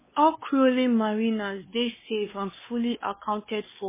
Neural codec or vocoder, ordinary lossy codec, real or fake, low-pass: codec, 16 kHz, 2 kbps, X-Codec, WavLM features, trained on Multilingual LibriSpeech; MP3, 16 kbps; fake; 3.6 kHz